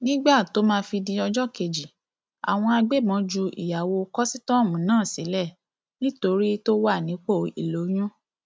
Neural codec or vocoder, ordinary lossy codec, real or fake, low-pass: none; none; real; none